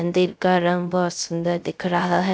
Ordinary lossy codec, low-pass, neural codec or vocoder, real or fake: none; none; codec, 16 kHz, 0.3 kbps, FocalCodec; fake